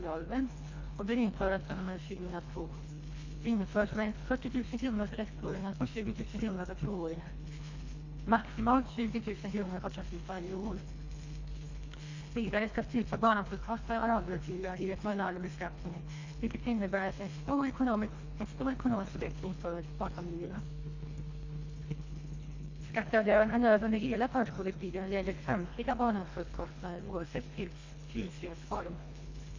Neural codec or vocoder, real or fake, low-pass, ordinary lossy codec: codec, 24 kHz, 1.5 kbps, HILCodec; fake; 7.2 kHz; AAC, 48 kbps